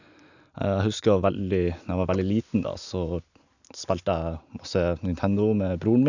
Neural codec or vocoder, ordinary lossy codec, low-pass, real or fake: none; none; 7.2 kHz; real